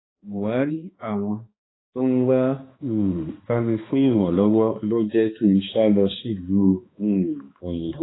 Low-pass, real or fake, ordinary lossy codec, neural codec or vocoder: 7.2 kHz; fake; AAC, 16 kbps; codec, 16 kHz, 2 kbps, X-Codec, HuBERT features, trained on balanced general audio